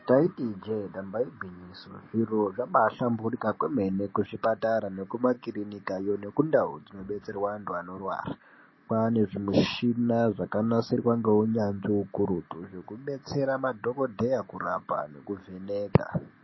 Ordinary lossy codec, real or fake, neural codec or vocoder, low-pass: MP3, 24 kbps; real; none; 7.2 kHz